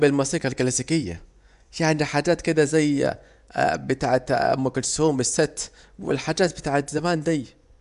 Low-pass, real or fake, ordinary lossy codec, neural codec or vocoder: 10.8 kHz; fake; none; vocoder, 24 kHz, 100 mel bands, Vocos